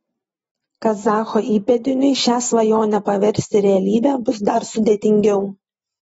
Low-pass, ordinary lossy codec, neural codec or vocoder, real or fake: 19.8 kHz; AAC, 24 kbps; none; real